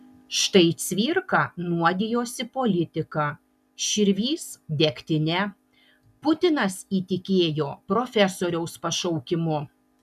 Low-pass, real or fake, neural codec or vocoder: 14.4 kHz; real; none